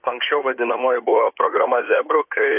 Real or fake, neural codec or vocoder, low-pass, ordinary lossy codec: fake; codec, 16 kHz in and 24 kHz out, 2.2 kbps, FireRedTTS-2 codec; 3.6 kHz; AAC, 32 kbps